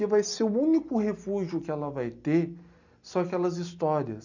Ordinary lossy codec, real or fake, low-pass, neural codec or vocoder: none; real; 7.2 kHz; none